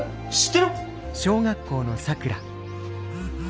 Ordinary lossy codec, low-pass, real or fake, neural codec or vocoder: none; none; real; none